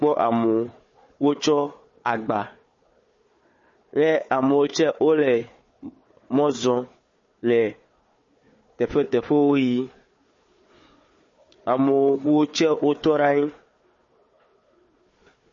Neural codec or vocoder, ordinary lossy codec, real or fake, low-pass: codec, 16 kHz, 4 kbps, FunCodec, trained on Chinese and English, 50 frames a second; MP3, 32 kbps; fake; 7.2 kHz